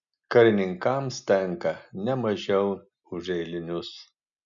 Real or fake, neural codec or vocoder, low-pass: real; none; 7.2 kHz